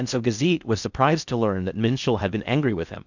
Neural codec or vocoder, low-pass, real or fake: codec, 16 kHz in and 24 kHz out, 0.6 kbps, FocalCodec, streaming, 4096 codes; 7.2 kHz; fake